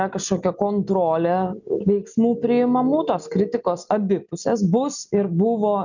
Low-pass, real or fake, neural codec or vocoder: 7.2 kHz; real; none